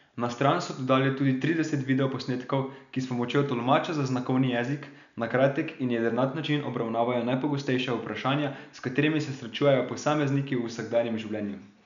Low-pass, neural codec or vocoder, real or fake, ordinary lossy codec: 7.2 kHz; none; real; none